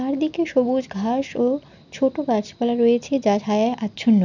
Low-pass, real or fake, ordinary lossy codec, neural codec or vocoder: 7.2 kHz; real; none; none